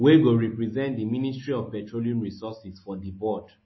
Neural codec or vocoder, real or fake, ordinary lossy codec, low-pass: none; real; MP3, 24 kbps; 7.2 kHz